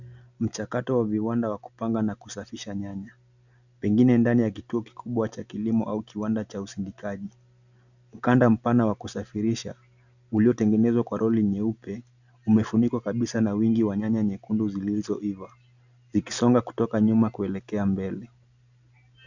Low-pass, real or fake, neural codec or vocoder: 7.2 kHz; real; none